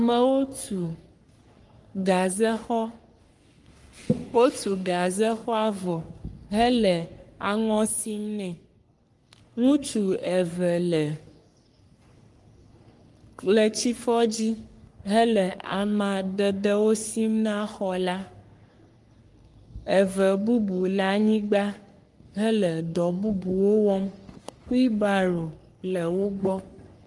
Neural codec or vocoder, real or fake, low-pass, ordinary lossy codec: codec, 44.1 kHz, 3.4 kbps, Pupu-Codec; fake; 10.8 kHz; Opus, 32 kbps